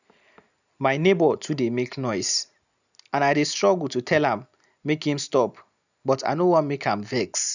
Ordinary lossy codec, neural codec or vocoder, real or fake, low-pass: none; none; real; 7.2 kHz